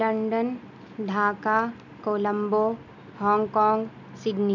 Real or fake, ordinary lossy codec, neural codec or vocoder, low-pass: real; none; none; 7.2 kHz